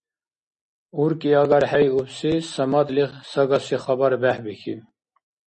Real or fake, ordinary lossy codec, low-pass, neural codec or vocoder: real; MP3, 32 kbps; 10.8 kHz; none